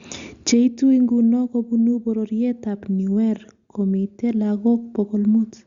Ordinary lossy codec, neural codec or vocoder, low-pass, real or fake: Opus, 64 kbps; none; 7.2 kHz; real